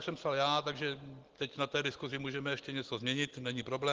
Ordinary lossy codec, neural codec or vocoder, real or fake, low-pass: Opus, 16 kbps; none; real; 7.2 kHz